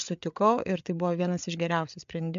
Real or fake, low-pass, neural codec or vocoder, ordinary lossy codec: fake; 7.2 kHz; codec, 16 kHz, 8 kbps, FreqCodec, larger model; MP3, 64 kbps